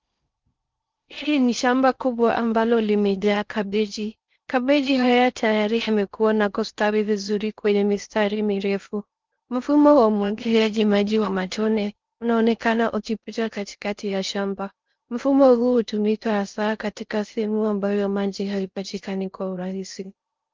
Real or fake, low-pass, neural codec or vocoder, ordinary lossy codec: fake; 7.2 kHz; codec, 16 kHz in and 24 kHz out, 0.6 kbps, FocalCodec, streaming, 4096 codes; Opus, 32 kbps